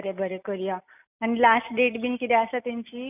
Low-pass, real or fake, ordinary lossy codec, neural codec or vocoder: 3.6 kHz; real; none; none